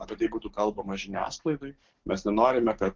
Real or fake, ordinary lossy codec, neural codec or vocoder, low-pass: fake; Opus, 16 kbps; codec, 44.1 kHz, 7.8 kbps, DAC; 7.2 kHz